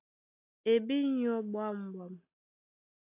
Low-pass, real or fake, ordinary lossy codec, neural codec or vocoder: 3.6 kHz; real; AAC, 32 kbps; none